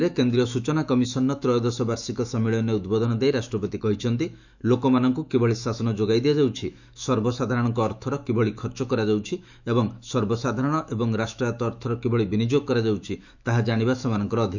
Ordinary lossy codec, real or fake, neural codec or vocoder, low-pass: none; fake; autoencoder, 48 kHz, 128 numbers a frame, DAC-VAE, trained on Japanese speech; 7.2 kHz